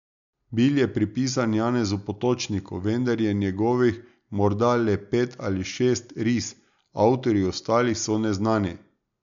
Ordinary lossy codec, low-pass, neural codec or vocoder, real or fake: MP3, 96 kbps; 7.2 kHz; none; real